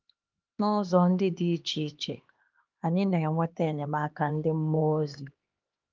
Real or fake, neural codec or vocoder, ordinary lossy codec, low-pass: fake; codec, 16 kHz, 2 kbps, X-Codec, HuBERT features, trained on LibriSpeech; Opus, 32 kbps; 7.2 kHz